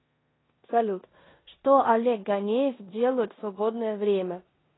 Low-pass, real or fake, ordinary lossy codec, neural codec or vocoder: 7.2 kHz; fake; AAC, 16 kbps; codec, 16 kHz in and 24 kHz out, 0.9 kbps, LongCat-Audio-Codec, four codebook decoder